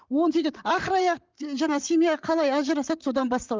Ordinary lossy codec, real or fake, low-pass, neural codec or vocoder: Opus, 24 kbps; fake; 7.2 kHz; vocoder, 44.1 kHz, 128 mel bands, Pupu-Vocoder